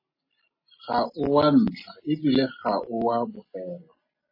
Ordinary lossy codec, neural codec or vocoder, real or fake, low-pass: MP3, 24 kbps; none; real; 5.4 kHz